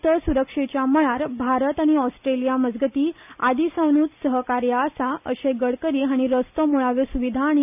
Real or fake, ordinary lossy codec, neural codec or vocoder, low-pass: real; none; none; 3.6 kHz